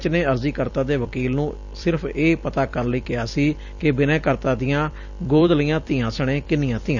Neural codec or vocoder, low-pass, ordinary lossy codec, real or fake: vocoder, 44.1 kHz, 128 mel bands every 256 samples, BigVGAN v2; 7.2 kHz; none; fake